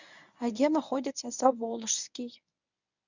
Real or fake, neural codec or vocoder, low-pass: fake; codec, 24 kHz, 0.9 kbps, WavTokenizer, medium speech release version 1; 7.2 kHz